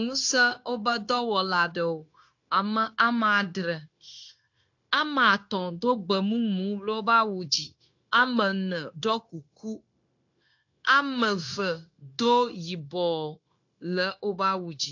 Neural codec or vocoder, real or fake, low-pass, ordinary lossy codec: codec, 16 kHz, 0.9 kbps, LongCat-Audio-Codec; fake; 7.2 kHz; AAC, 48 kbps